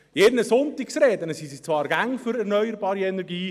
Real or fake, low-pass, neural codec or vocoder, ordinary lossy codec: real; 14.4 kHz; none; none